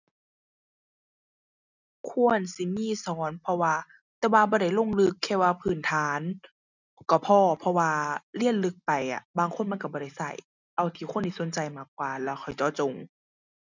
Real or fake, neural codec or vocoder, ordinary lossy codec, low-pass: real; none; none; 7.2 kHz